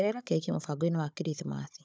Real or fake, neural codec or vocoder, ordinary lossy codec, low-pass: fake; codec, 16 kHz, 16 kbps, FunCodec, trained on Chinese and English, 50 frames a second; none; none